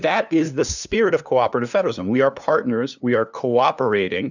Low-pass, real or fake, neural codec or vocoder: 7.2 kHz; fake; codec, 16 kHz, 2 kbps, FunCodec, trained on LibriTTS, 25 frames a second